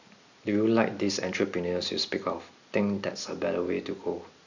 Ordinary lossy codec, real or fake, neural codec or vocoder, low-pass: none; real; none; 7.2 kHz